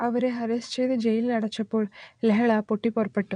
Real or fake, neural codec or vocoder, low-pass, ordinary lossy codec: fake; vocoder, 22.05 kHz, 80 mel bands, WaveNeXt; 9.9 kHz; none